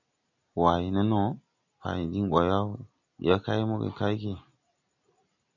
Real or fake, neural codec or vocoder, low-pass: real; none; 7.2 kHz